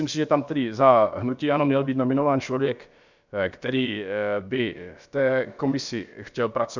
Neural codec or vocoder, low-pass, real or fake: codec, 16 kHz, about 1 kbps, DyCAST, with the encoder's durations; 7.2 kHz; fake